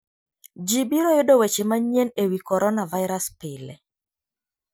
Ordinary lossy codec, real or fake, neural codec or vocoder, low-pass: none; real; none; none